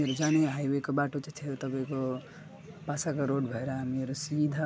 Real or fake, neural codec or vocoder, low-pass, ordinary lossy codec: real; none; none; none